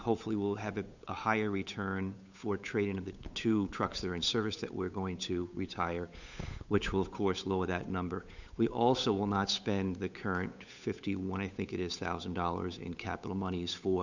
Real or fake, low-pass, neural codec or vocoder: fake; 7.2 kHz; codec, 16 kHz, 8 kbps, FunCodec, trained on Chinese and English, 25 frames a second